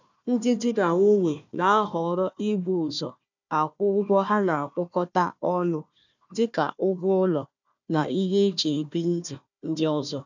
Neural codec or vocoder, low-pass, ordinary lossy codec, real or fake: codec, 16 kHz, 1 kbps, FunCodec, trained on Chinese and English, 50 frames a second; 7.2 kHz; none; fake